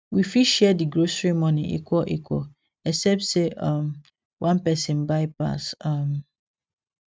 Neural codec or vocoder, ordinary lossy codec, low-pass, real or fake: none; none; none; real